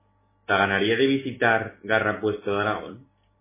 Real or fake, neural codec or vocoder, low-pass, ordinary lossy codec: real; none; 3.6 kHz; MP3, 16 kbps